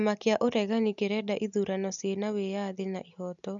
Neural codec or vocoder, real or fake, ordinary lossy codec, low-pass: none; real; none; 7.2 kHz